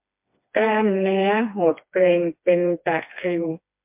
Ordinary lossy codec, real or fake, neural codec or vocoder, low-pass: MP3, 32 kbps; fake; codec, 16 kHz, 2 kbps, FreqCodec, smaller model; 3.6 kHz